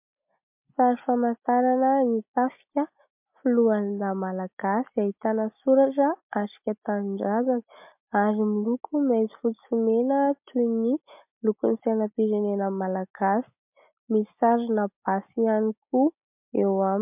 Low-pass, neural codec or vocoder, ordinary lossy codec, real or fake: 3.6 kHz; none; MP3, 32 kbps; real